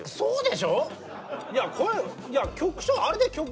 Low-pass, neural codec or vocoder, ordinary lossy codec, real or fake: none; none; none; real